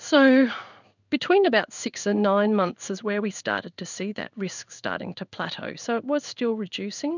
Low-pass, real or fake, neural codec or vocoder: 7.2 kHz; fake; codec, 16 kHz in and 24 kHz out, 1 kbps, XY-Tokenizer